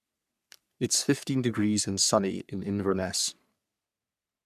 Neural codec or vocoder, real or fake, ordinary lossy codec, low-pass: codec, 44.1 kHz, 3.4 kbps, Pupu-Codec; fake; none; 14.4 kHz